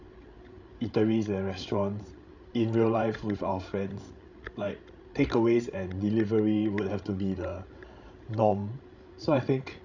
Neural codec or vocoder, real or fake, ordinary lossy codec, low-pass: codec, 16 kHz, 16 kbps, FreqCodec, larger model; fake; none; 7.2 kHz